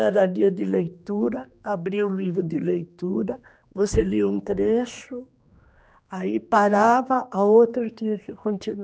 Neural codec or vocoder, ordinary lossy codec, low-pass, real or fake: codec, 16 kHz, 2 kbps, X-Codec, HuBERT features, trained on general audio; none; none; fake